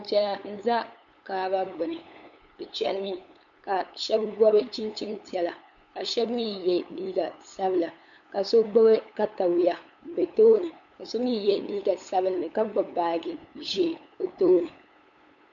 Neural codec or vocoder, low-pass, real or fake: codec, 16 kHz, 8 kbps, FunCodec, trained on LibriTTS, 25 frames a second; 7.2 kHz; fake